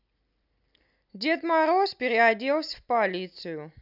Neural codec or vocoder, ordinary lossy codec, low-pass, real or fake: none; none; 5.4 kHz; real